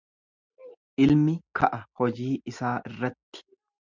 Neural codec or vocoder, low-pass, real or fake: none; 7.2 kHz; real